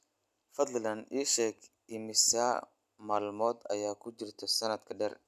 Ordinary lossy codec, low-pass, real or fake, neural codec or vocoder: none; 14.4 kHz; real; none